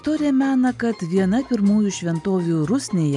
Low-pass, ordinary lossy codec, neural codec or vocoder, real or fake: 10.8 kHz; MP3, 96 kbps; none; real